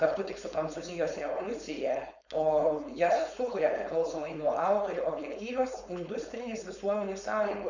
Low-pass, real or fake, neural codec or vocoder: 7.2 kHz; fake; codec, 16 kHz, 4.8 kbps, FACodec